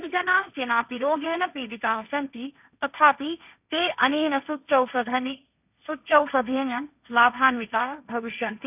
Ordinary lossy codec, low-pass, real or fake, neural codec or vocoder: none; 3.6 kHz; fake; codec, 16 kHz, 1.1 kbps, Voila-Tokenizer